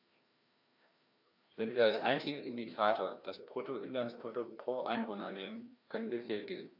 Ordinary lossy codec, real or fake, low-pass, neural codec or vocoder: AAC, 48 kbps; fake; 5.4 kHz; codec, 16 kHz, 1 kbps, FreqCodec, larger model